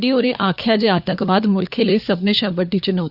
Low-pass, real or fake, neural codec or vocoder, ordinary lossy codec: 5.4 kHz; fake; codec, 16 kHz, 4 kbps, FunCodec, trained on LibriTTS, 50 frames a second; Opus, 64 kbps